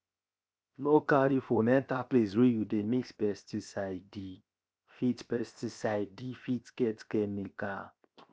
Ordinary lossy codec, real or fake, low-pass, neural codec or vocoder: none; fake; none; codec, 16 kHz, 0.7 kbps, FocalCodec